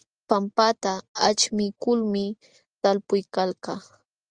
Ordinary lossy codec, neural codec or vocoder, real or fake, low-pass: Opus, 32 kbps; none; real; 9.9 kHz